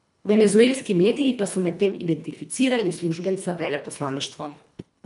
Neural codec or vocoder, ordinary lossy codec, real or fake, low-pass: codec, 24 kHz, 1.5 kbps, HILCodec; none; fake; 10.8 kHz